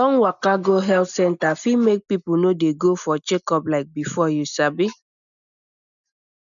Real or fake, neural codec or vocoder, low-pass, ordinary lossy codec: real; none; 7.2 kHz; none